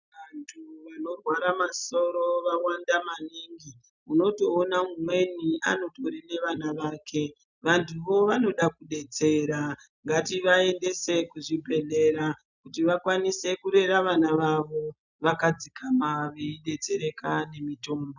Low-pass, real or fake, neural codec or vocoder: 7.2 kHz; real; none